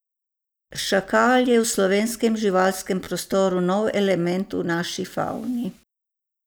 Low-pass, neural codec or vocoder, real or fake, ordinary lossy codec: none; none; real; none